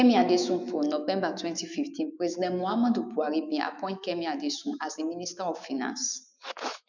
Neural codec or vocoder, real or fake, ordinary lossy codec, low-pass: autoencoder, 48 kHz, 128 numbers a frame, DAC-VAE, trained on Japanese speech; fake; none; 7.2 kHz